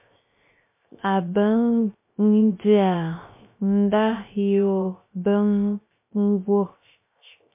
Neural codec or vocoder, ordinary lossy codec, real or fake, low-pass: codec, 16 kHz, 0.3 kbps, FocalCodec; MP3, 24 kbps; fake; 3.6 kHz